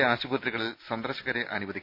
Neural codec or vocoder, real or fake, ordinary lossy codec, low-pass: none; real; none; 5.4 kHz